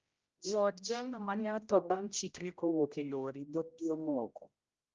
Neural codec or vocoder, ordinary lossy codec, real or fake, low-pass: codec, 16 kHz, 0.5 kbps, X-Codec, HuBERT features, trained on general audio; Opus, 24 kbps; fake; 7.2 kHz